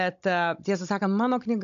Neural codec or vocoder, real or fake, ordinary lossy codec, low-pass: codec, 16 kHz, 16 kbps, FunCodec, trained on Chinese and English, 50 frames a second; fake; AAC, 64 kbps; 7.2 kHz